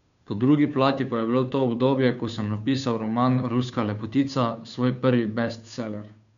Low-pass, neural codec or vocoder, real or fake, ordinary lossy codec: 7.2 kHz; codec, 16 kHz, 2 kbps, FunCodec, trained on Chinese and English, 25 frames a second; fake; none